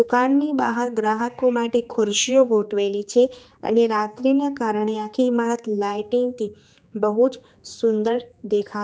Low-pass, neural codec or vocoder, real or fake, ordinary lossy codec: none; codec, 16 kHz, 2 kbps, X-Codec, HuBERT features, trained on general audio; fake; none